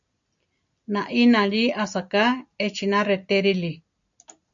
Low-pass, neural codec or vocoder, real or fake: 7.2 kHz; none; real